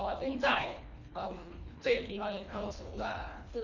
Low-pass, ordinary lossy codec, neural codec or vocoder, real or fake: 7.2 kHz; none; codec, 24 kHz, 1.5 kbps, HILCodec; fake